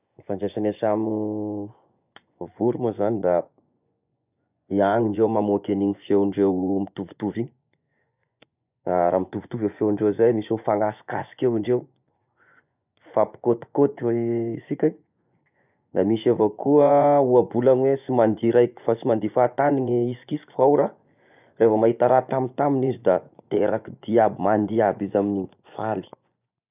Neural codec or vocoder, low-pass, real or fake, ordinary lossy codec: vocoder, 24 kHz, 100 mel bands, Vocos; 3.6 kHz; fake; none